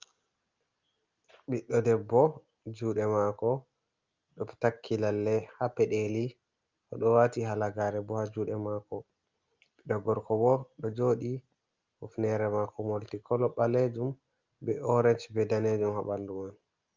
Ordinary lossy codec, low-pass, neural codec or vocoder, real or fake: Opus, 24 kbps; 7.2 kHz; none; real